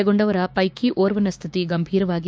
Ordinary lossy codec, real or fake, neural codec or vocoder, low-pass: none; fake; codec, 16 kHz, 6 kbps, DAC; none